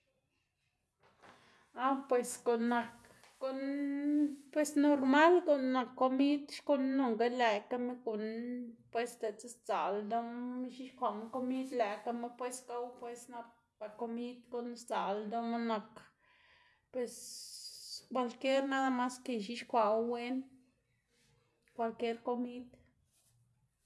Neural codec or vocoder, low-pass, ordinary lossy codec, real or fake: none; none; none; real